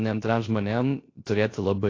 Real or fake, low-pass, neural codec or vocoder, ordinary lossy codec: fake; 7.2 kHz; codec, 16 kHz, 0.3 kbps, FocalCodec; AAC, 32 kbps